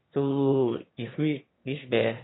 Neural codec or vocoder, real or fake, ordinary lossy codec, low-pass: autoencoder, 22.05 kHz, a latent of 192 numbers a frame, VITS, trained on one speaker; fake; AAC, 16 kbps; 7.2 kHz